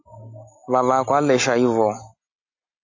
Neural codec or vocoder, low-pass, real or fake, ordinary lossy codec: none; 7.2 kHz; real; AAC, 48 kbps